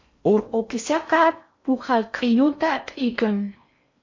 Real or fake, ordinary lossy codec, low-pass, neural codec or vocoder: fake; MP3, 48 kbps; 7.2 kHz; codec, 16 kHz in and 24 kHz out, 0.6 kbps, FocalCodec, streaming, 4096 codes